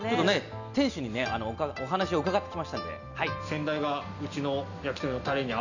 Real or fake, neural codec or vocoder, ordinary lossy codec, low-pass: real; none; none; 7.2 kHz